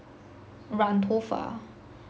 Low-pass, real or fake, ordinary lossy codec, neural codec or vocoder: none; real; none; none